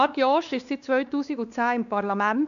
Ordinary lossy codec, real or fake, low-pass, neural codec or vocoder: none; fake; 7.2 kHz; codec, 16 kHz, 2 kbps, X-Codec, WavLM features, trained on Multilingual LibriSpeech